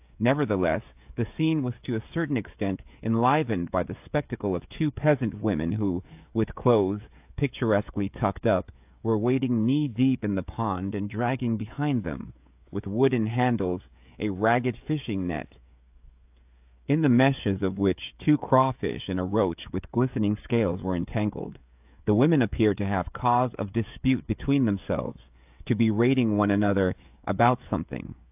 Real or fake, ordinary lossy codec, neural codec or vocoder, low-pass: fake; AAC, 32 kbps; codec, 16 kHz, 16 kbps, FreqCodec, smaller model; 3.6 kHz